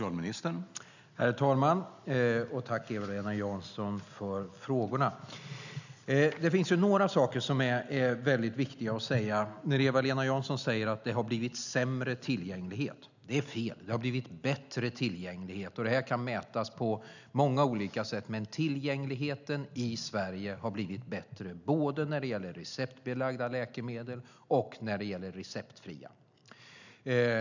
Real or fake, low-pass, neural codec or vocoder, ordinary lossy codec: real; 7.2 kHz; none; none